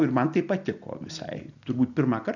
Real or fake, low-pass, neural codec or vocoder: real; 7.2 kHz; none